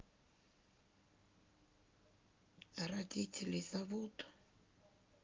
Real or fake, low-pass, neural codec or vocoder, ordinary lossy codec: real; 7.2 kHz; none; Opus, 32 kbps